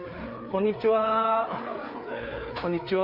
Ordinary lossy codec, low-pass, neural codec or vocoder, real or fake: none; 5.4 kHz; codec, 16 kHz, 4 kbps, FreqCodec, larger model; fake